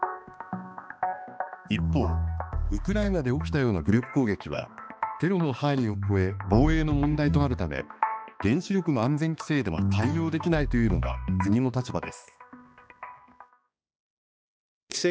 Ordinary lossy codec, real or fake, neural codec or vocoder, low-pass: none; fake; codec, 16 kHz, 2 kbps, X-Codec, HuBERT features, trained on balanced general audio; none